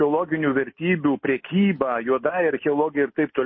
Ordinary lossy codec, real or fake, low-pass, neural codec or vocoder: MP3, 24 kbps; real; 7.2 kHz; none